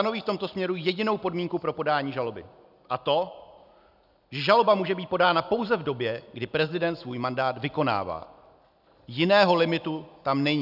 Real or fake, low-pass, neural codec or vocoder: real; 5.4 kHz; none